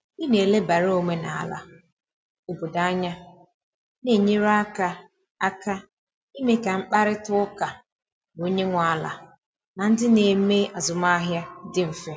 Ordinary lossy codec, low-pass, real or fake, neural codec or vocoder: none; none; real; none